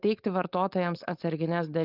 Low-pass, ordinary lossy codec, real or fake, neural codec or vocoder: 5.4 kHz; Opus, 24 kbps; fake; codec, 16 kHz, 4.8 kbps, FACodec